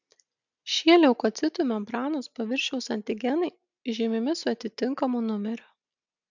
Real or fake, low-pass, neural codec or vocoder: real; 7.2 kHz; none